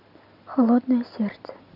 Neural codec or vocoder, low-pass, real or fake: none; 5.4 kHz; real